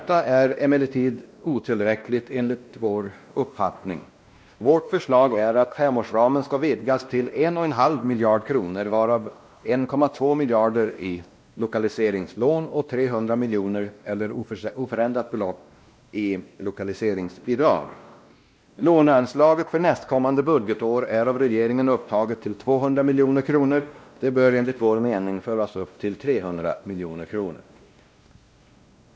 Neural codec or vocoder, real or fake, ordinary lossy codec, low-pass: codec, 16 kHz, 1 kbps, X-Codec, WavLM features, trained on Multilingual LibriSpeech; fake; none; none